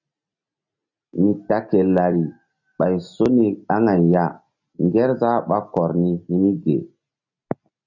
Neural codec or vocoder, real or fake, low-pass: none; real; 7.2 kHz